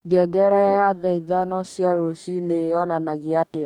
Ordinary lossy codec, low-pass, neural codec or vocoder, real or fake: none; 19.8 kHz; codec, 44.1 kHz, 2.6 kbps, DAC; fake